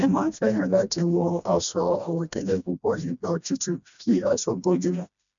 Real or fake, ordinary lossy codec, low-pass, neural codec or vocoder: fake; none; 7.2 kHz; codec, 16 kHz, 1 kbps, FreqCodec, smaller model